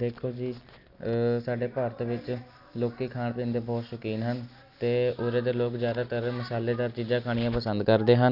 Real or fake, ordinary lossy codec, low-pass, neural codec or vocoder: real; none; 5.4 kHz; none